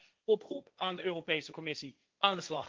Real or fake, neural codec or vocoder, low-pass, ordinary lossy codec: fake; codec, 16 kHz, 1.1 kbps, Voila-Tokenizer; 7.2 kHz; Opus, 32 kbps